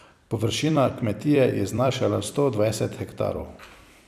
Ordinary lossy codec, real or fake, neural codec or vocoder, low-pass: none; fake; vocoder, 44.1 kHz, 128 mel bands every 256 samples, BigVGAN v2; 14.4 kHz